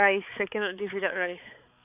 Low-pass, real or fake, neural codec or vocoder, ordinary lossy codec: 3.6 kHz; fake; codec, 16 kHz, 4 kbps, X-Codec, HuBERT features, trained on balanced general audio; none